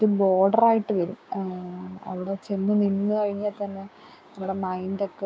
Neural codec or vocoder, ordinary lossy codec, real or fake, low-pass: codec, 16 kHz, 6 kbps, DAC; none; fake; none